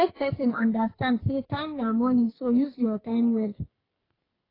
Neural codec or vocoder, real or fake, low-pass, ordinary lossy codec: codec, 44.1 kHz, 2.6 kbps, SNAC; fake; 5.4 kHz; AAC, 24 kbps